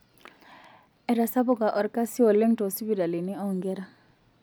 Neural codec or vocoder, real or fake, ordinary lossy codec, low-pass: none; real; none; none